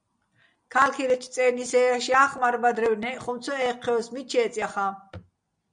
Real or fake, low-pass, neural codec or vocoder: real; 9.9 kHz; none